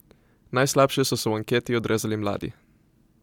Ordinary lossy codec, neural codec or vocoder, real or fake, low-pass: MP3, 96 kbps; none; real; 19.8 kHz